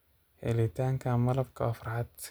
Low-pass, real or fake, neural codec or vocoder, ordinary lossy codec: none; real; none; none